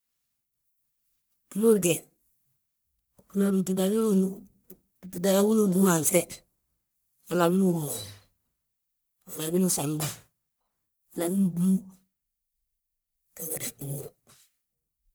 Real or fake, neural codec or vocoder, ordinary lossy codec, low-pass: fake; codec, 44.1 kHz, 1.7 kbps, Pupu-Codec; none; none